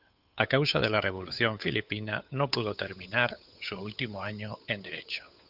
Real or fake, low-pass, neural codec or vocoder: fake; 5.4 kHz; codec, 16 kHz, 8 kbps, FunCodec, trained on Chinese and English, 25 frames a second